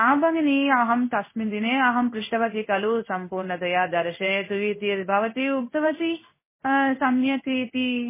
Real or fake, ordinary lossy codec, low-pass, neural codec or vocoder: fake; MP3, 16 kbps; 3.6 kHz; codec, 16 kHz in and 24 kHz out, 1 kbps, XY-Tokenizer